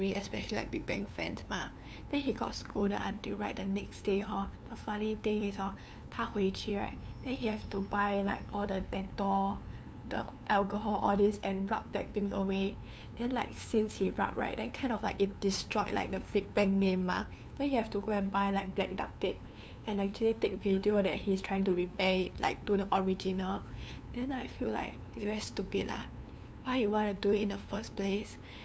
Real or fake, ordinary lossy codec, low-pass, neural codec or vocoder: fake; none; none; codec, 16 kHz, 2 kbps, FunCodec, trained on LibriTTS, 25 frames a second